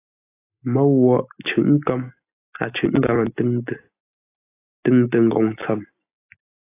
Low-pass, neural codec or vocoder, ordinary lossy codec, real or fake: 3.6 kHz; none; AAC, 24 kbps; real